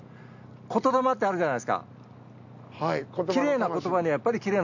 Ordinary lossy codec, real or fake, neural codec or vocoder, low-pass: none; real; none; 7.2 kHz